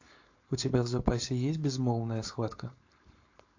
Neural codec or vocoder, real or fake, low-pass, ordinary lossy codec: codec, 16 kHz, 4.8 kbps, FACodec; fake; 7.2 kHz; AAC, 48 kbps